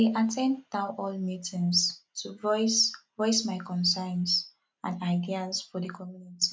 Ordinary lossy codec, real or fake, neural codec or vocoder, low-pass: none; real; none; none